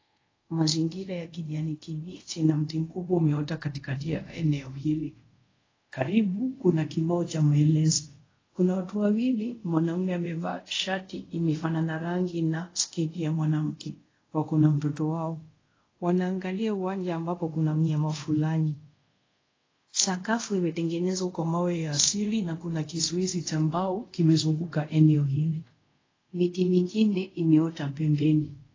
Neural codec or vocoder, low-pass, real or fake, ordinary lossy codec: codec, 24 kHz, 0.5 kbps, DualCodec; 7.2 kHz; fake; AAC, 32 kbps